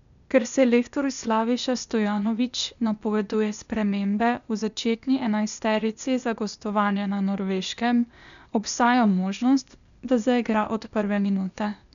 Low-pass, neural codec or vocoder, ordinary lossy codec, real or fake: 7.2 kHz; codec, 16 kHz, 0.8 kbps, ZipCodec; none; fake